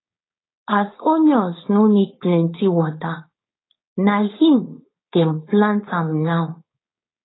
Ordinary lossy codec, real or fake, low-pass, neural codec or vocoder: AAC, 16 kbps; fake; 7.2 kHz; codec, 16 kHz, 4.8 kbps, FACodec